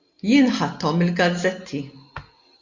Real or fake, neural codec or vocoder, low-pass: real; none; 7.2 kHz